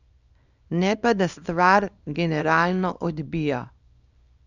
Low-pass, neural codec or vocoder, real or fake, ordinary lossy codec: 7.2 kHz; codec, 24 kHz, 0.9 kbps, WavTokenizer, small release; fake; none